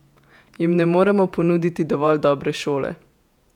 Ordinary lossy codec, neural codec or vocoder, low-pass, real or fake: none; vocoder, 48 kHz, 128 mel bands, Vocos; 19.8 kHz; fake